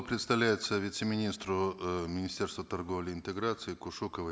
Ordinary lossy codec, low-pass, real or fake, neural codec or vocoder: none; none; real; none